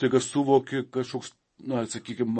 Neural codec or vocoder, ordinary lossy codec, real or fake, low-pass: vocoder, 24 kHz, 100 mel bands, Vocos; MP3, 32 kbps; fake; 10.8 kHz